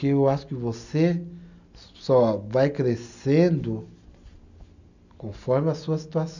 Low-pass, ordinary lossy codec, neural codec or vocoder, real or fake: 7.2 kHz; none; none; real